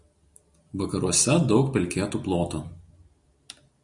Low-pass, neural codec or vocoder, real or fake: 10.8 kHz; none; real